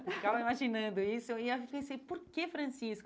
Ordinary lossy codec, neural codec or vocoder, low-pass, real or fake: none; none; none; real